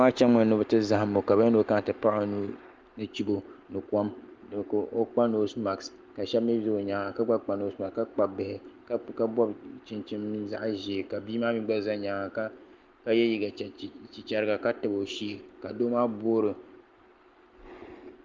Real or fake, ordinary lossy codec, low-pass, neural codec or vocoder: real; Opus, 24 kbps; 7.2 kHz; none